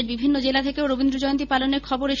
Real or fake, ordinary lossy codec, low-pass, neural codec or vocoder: real; none; 7.2 kHz; none